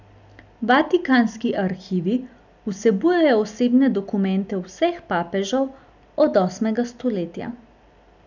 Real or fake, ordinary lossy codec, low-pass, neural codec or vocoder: real; none; 7.2 kHz; none